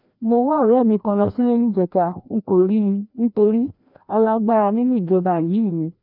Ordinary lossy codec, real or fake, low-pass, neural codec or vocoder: none; fake; 5.4 kHz; codec, 16 kHz, 1 kbps, FreqCodec, larger model